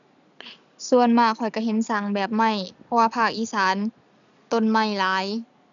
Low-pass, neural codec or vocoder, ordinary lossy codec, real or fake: 7.2 kHz; none; none; real